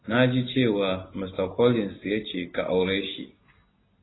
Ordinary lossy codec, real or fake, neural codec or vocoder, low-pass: AAC, 16 kbps; real; none; 7.2 kHz